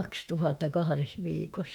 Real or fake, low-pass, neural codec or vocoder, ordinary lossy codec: fake; 19.8 kHz; autoencoder, 48 kHz, 32 numbers a frame, DAC-VAE, trained on Japanese speech; none